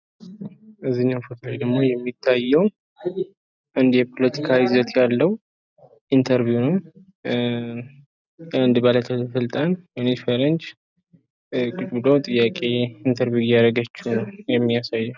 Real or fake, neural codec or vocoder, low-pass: real; none; 7.2 kHz